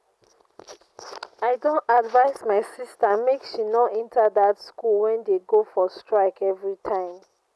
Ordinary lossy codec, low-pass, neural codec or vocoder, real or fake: none; none; none; real